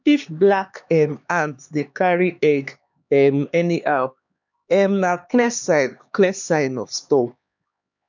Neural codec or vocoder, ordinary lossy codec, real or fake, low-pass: codec, 16 kHz, 2 kbps, X-Codec, HuBERT features, trained on LibriSpeech; none; fake; 7.2 kHz